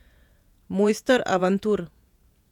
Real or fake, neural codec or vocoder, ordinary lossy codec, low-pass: fake; vocoder, 48 kHz, 128 mel bands, Vocos; none; 19.8 kHz